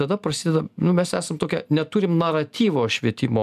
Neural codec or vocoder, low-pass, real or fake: none; 14.4 kHz; real